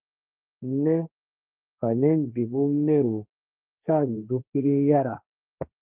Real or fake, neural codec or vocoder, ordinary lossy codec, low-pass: fake; codec, 16 kHz, 1.1 kbps, Voila-Tokenizer; Opus, 32 kbps; 3.6 kHz